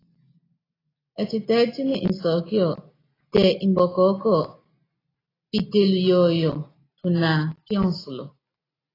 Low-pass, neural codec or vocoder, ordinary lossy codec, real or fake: 5.4 kHz; none; AAC, 24 kbps; real